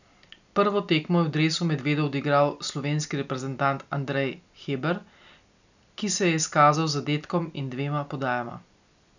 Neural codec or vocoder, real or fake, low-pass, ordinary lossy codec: none; real; 7.2 kHz; none